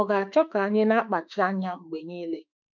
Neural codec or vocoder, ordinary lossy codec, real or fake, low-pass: autoencoder, 48 kHz, 32 numbers a frame, DAC-VAE, trained on Japanese speech; none; fake; 7.2 kHz